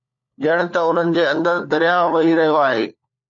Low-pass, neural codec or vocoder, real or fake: 7.2 kHz; codec, 16 kHz, 4 kbps, FunCodec, trained on LibriTTS, 50 frames a second; fake